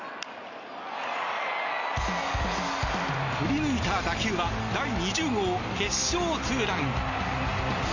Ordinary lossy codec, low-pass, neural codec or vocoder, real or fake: none; 7.2 kHz; none; real